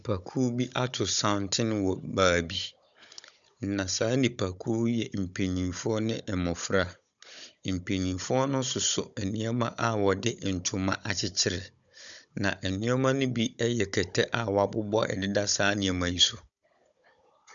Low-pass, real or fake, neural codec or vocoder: 7.2 kHz; fake; codec, 16 kHz, 16 kbps, FunCodec, trained on Chinese and English, 50 frames a second